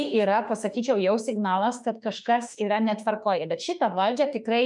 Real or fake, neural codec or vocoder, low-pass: fake; autoencoder, 48 kHz, 32 numbers a frame, DAC-VAE, trained on Japanese speech; 10.8 kHz